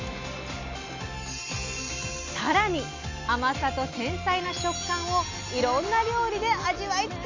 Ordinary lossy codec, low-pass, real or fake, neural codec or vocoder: AAC, 48 kbps; 7.2 kHz; real; none